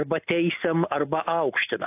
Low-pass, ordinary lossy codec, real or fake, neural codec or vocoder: 3.6 kHz; AAC, 24 kbps; real; none